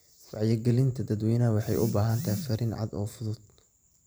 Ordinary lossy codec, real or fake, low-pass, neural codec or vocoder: none; fake; none; vocoder, 44.1 kHz, 128 mel bands every 512 samples, BigVGAN v2